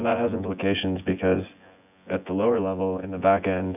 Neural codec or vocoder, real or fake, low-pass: vocoder, 24 kHz, 100 mel bands, Vocos; fake; 3.6 kHz